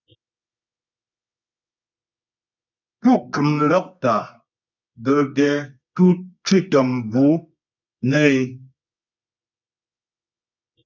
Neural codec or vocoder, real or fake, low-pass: codec, 24 kHz, 0.9 kbps, WavTokenizer, medium music audio release; fake; 7.2 kHz